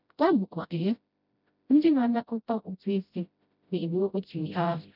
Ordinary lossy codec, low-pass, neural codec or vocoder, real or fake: none; 5.4 kHz; codec, 16 kHz, 0.5 kbps, FreqCodec, smaller model; fake